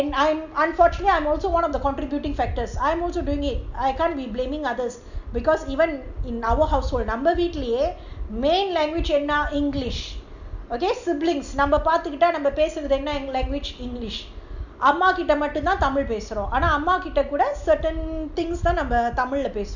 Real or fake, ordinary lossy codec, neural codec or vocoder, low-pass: real; none; none; 7.2 kHz